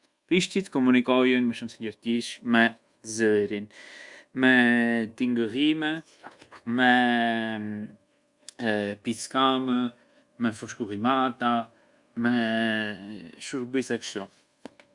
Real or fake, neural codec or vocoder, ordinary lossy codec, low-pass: fake; codec, 24 kHz, 1.2 kbps, DualCodec; Opus, 64 kbps; 10.8 kHz